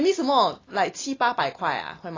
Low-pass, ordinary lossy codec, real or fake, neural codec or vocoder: 7.2 kHz; AAC, 32 kbps; real; none